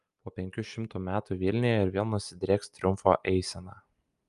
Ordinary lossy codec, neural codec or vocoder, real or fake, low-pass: Opus, 32 kbps; none; real; 10.8 kHz